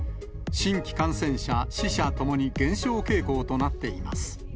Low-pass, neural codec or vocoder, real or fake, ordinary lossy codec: none; none; real; none